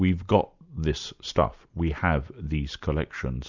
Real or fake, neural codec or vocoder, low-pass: real; none; 7.2 kHz